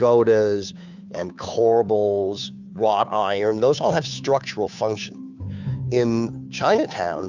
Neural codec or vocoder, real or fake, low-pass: codec, 16 kHz, 2 kbps, FunCodec, trained on Chinese and English, 25 frames a second; fake; 7.2 kHz